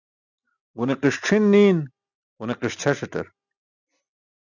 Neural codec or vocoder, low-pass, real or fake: none; 7.2 kHz; real